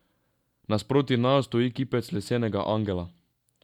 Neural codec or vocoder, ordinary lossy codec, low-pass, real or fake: none; none; 19.8 kHz; real